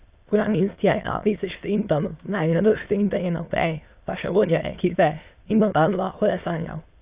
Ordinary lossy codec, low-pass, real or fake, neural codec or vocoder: Opus, 64 kbps; 3.6 kHz; fake; autoencoder, 22.05 kHz, a latent of 192 numbers a frame, VITS, trained on many speakers